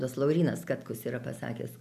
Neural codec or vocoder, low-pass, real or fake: none; 14.4 kHz; real